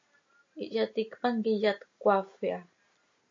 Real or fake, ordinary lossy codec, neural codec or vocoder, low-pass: real; AAC, 48 kbps; none; 7.2 kHz